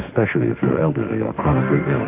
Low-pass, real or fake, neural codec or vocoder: 3.6 kHz; fake; autoencoder, 48 kHz, 32 numbers a frame, DAC-VAE, trained on Japanese speech